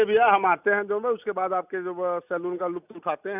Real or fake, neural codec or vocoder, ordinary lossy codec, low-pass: real; none; none; 3.6 kHz